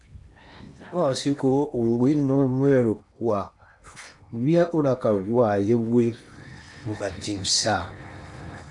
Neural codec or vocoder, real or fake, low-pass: codec, 16 kHz in and 24 kHz out, 0.8 kbps, FocalCodec, streaming, 65536 codes; fake; 10.8 kHz